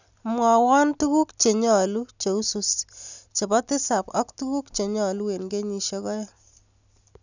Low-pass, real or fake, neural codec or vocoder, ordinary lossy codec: 7.2 kHz; real; none; none